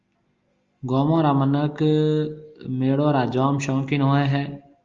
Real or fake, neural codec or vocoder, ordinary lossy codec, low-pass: real; none; Opus, 32 kbps; 7.2 kHz